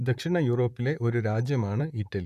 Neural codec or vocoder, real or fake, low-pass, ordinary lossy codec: vocoder, 44.1 kHz, 128 mel bands, Pupu-Vocoder; fake; 14.4 kHz; none